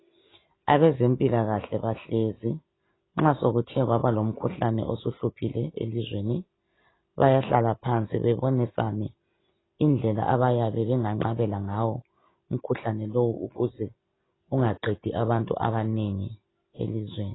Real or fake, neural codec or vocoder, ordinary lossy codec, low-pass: real; none; AAC, 16 kbps; 7.2 kHz